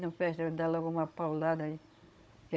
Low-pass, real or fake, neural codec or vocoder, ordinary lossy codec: none; fake; codec, 16 kHz, 16 kbps, FunCodec, trained on Chinese and English, 50 frames a second; none